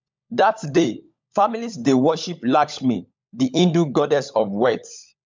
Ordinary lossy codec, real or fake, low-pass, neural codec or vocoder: MP3, 64 kbps; fake; 7.2 kHz; codec, 16 kHz, 16 kbps, FunCodec, trained on LibriTTS, 50 frames a second